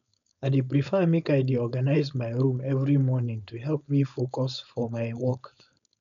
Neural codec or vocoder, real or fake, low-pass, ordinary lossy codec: codec, 16 kHz, 4.8 kbps, FACodec; fake; 7.2 kHz; none